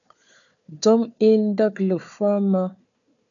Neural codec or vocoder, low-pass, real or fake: codec, 16 kHz, 4 kbps, FunCodec, trained on Chinese and English, 50 frames a second; 7.2 kHz; fake